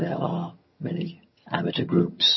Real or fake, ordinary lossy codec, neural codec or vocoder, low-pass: fake; MP3, 24 kbps; vocoder, 22.05 kHz, 80 mel bands, HiFi-GAN; 7.2 kHz